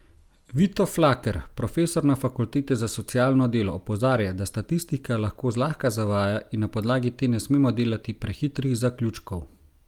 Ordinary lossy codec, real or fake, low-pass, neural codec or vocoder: Opus, 32 kbps; real; 19.8 kHz; none